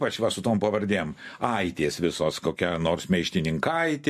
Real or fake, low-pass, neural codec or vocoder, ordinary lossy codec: real; 14.4 kHz; none; MP3, 64 kbps